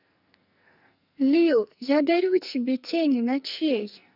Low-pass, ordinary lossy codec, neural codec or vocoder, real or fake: 5.4 kHz; none; codec, 44.1 kHz, 2.6 kbps, SNAC; fake